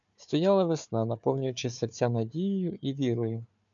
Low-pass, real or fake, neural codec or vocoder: 7.2 kHz; fake; codec, 16 kHz, 4 kbps, FunCodec, trained on Chinese and English, 50 frames a second